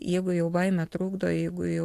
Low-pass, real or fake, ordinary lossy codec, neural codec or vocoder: 14.4 kHz; real; AAC, 64 kbps; none